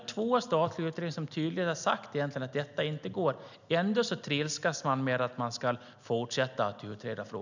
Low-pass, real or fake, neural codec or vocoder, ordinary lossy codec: 7.2 kHz; real; none; none